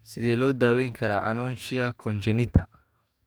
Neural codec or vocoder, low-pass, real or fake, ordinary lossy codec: codec, 44.1 kHz, 2.6 kbps, DAC; none; fake; none